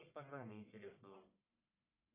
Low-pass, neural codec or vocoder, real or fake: 3.6 kHz; codec, 44.1 kHz, 1.7 kbps, Pupu-Codec; fake